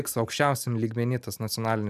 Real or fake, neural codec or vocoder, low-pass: real; none; 14.4 kHz